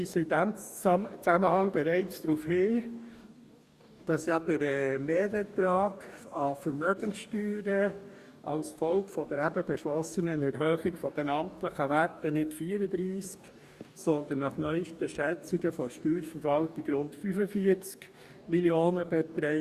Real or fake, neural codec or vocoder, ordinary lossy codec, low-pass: fake; codec, 44.1 kHz, 2.6 kbps, DAC; Opus, 64 kbps; 14.4 kHz